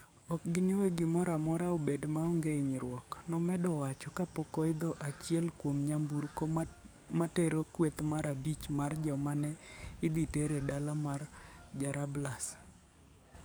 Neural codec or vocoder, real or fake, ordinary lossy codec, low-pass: codec, 44.1 kHz, 7.8 kbps, DAC; fake; none; none